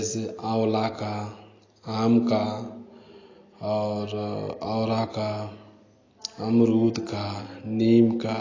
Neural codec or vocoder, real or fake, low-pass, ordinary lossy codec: none; real; 7.2 kHz; AAC, 32 kbps